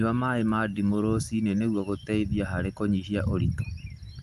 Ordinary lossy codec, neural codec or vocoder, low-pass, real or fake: Opus, 32 kbps; none; 14.4 kHz; real